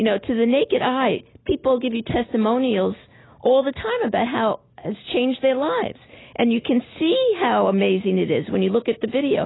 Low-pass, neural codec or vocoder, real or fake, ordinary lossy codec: 7.2 kHz; none; real; AAC, 16 kbps